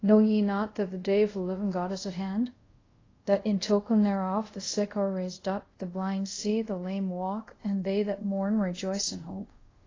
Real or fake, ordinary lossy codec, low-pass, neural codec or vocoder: fake; AAC, 32 kbps; 7.2 kHz; codec, 24 kHz, 0.5 kbps, DualCodec